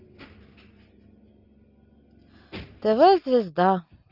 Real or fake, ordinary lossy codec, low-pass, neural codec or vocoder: real; Opus, 24 kbps; 5.4 kHz; none